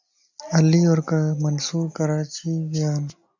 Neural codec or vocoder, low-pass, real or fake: none; 7.2 kHz; real